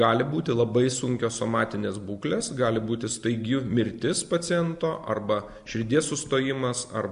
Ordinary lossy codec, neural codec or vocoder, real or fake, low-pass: MP3, 64 kbps; none; real; 10.8 kHz